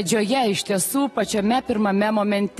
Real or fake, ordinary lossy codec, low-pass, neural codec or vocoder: real; AAC, 32 kbps; 19.8 kHz; none